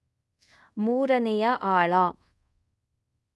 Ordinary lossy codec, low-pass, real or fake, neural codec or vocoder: none; none; fake; codec, 24 kHz, 0.5 kbps, DualCodec